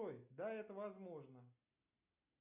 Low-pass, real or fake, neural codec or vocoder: 3.6 kHz; real; none